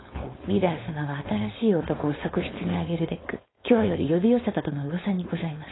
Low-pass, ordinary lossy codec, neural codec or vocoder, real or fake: 7.2 kHz; AAC, 16 kbps; codec, 16 kHz, 4.8 kbps, FACodec; fake